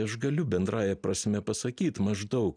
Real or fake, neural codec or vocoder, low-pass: real; none; 9.9 kHz